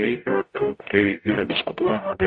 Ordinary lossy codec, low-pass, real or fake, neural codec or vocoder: MP3, 48 kbps; 9.9 kHz; fake; codec, 44.1 kHz, 0.9 kbps, DAC